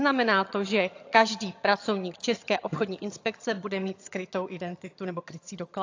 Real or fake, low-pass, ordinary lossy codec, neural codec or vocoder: fake; 7.2 kHz; AAC, 48 kbps; vocoder, 22.05 kHz, 80 mel bands, HiFi-GAN